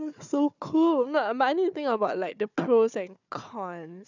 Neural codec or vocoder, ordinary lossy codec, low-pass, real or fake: codec, 16 kHz, 4 kbps, FunCodec, trained on Chinese and English, 50 frames a second; none; 7.2 kHz; fake